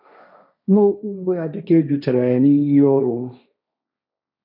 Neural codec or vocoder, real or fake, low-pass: codec, 16 kHz, 1.1 kbps, Voila-Tokenizer; fake; 5.4 kHz